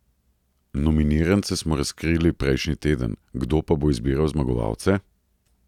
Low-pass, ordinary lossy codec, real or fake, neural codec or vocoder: 19.8 kHz; none; real; none